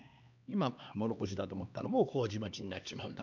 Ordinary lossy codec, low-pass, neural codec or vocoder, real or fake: none; 7.2 kHz; codec, 16 kHz, 2 kbps, X-Codec, HuBERT features, trained on LibriSpeech; fake